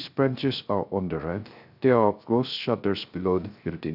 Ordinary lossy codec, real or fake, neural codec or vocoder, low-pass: none; fake; codec, 16 kHz, 0.3 kbps, FocalCodec; 5.4 kHz